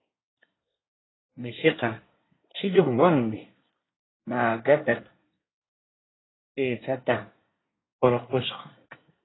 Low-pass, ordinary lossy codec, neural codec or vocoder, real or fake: 7.2 kHz; AAC, 16 kbps; codec, 24 kHz, 1 kbps, SNAC; fake